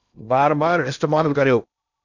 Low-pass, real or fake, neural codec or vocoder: 7.2 kHz; fake; codec, 16 kHz in and 24 kHz out, 0.6 kbps, FocalCodec, streaming, 2048 codes